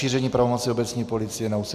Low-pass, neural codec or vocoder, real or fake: 14.4 kHz; none; real